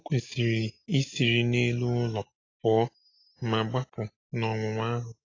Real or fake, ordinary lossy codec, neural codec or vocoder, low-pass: real; AAC, 32 kbps; none; 7.2 kHz